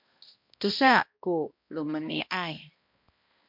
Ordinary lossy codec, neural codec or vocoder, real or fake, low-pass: MP3, 48 kbps; codec, 16 kHz, 1 kbps, X-Codec, HuBERT features, trained on balanced general audio; fake; 5.4 kHz